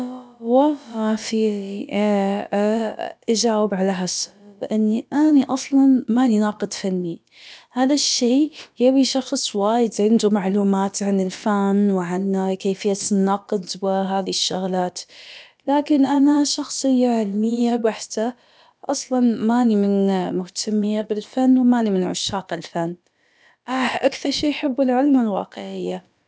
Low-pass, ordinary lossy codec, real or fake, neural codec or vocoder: none; none; fake; codec, 16 kHz, about 1 kbps, DyCAST, with the encoder's durations